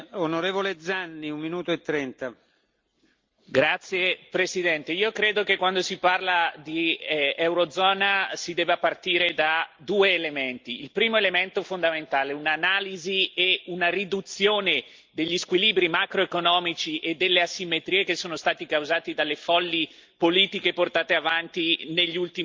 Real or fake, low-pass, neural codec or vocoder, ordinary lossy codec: real; 7.2 kHz; none; Opus, 24 kbps